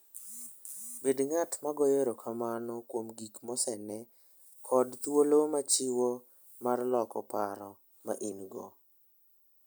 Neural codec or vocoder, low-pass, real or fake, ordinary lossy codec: none; none; real; none